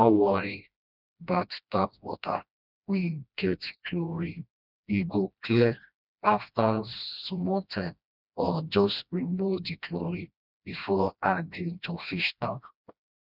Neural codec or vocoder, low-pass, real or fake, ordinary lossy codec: codec, 16 kHz, 1 kbps, FreqCodec, smaller model; 5.4 kHz; fake; none